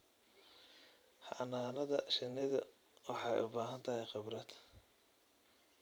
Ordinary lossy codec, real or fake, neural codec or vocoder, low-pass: none; fake; vocoder, 44.1 kHz, 128 mel bands every 512 samples, BigVGAN v2; none